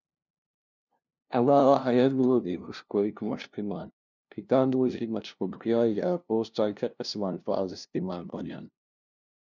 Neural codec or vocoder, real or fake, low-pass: codec, 16 kHz, 0.5 kbps, FunCodec, trained on LibriTTS, 25 frames a second; fake; 7.2 kHz